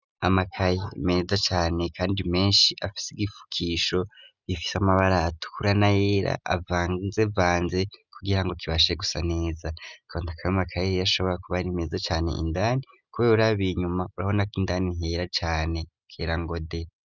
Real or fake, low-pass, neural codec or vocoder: real; 7.2 kHz; none